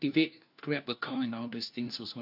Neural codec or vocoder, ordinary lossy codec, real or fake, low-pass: codec, 16 kHz, 1 kbps, FunCodec, trained on LibriTTS, 50 frames a second; AAC, 48 kbps; fake; 5.4 kHz